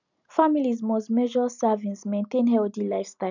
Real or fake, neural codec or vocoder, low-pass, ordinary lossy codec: real; none; 7.2 kHz; none